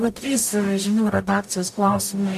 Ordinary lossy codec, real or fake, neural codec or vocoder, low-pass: AAC, 96 kbps; fake; codec, 44.1 kHz, 0.9 kbps, DAC; 14.4 kHz